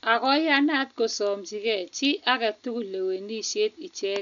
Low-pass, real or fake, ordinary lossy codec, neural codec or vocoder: 7.2 kHz; real; none; none